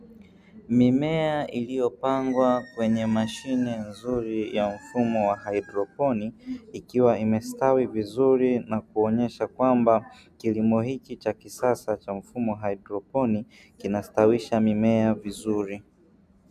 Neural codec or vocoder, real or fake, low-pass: none; real; 14.4 kHz